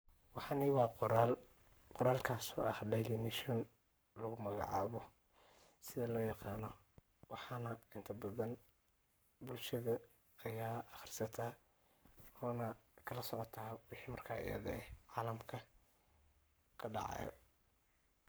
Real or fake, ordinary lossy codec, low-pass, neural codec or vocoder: fake; none; none; codec, 44.1 kHz, 7.8 kbps, Pupu-Codec